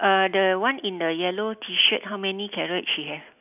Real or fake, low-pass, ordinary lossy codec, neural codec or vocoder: real; 3.6 kHz; none; none